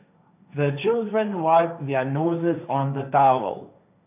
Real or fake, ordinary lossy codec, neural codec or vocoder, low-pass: fake; none; codec, 16 kHz, 1.1 kbps, Voila-Tokenizer; 3.6 kHz